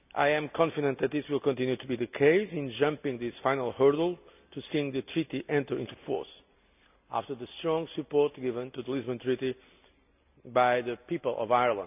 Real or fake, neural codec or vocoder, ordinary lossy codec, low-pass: real; none; none; 3.6 kHz